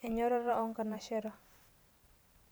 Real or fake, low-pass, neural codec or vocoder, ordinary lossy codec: fake; none; vocoder, 44.1 kHz, 128 mel bands every 512 samples, BigVGAN v2; none